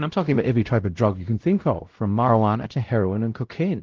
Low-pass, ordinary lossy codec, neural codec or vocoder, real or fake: 7.2 kHz; Opus, 16 kbps; codec, 16 kHz, 0.5 kbps, X-Codec, WavLM features, trained on Multilingual LibriSpeech; fake